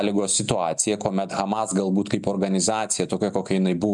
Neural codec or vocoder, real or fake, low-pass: none; real; 10.8 kHz